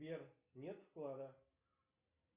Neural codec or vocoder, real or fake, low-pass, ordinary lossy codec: none; real; 3.6 kHz; Opus, 64 kbps